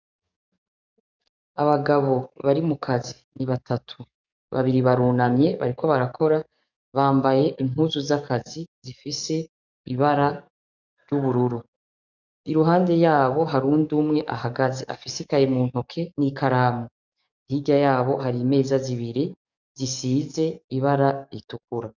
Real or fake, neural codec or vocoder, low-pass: fake; codec, 44.1 kHz, 7.8 kbps, DAC; 7.2 kHz